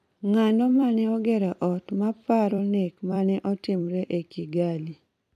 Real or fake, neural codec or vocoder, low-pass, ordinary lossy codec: fake; vocoder, 44.1 kHz, 128 mel bands every 256 samples, BigVGAN v2; 14.4 kHz; none